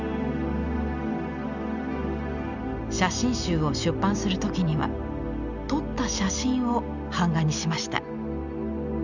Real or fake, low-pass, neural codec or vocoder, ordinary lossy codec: real; 7.2 kHz; none; none